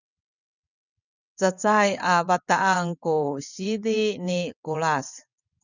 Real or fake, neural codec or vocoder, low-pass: fake; vocoder, 22.05 kHz, 80 mel bands, WaveNeXt; 7.2 kHz